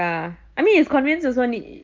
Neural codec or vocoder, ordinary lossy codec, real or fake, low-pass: none; Opus, 32 kbps; real; 7.2 kHz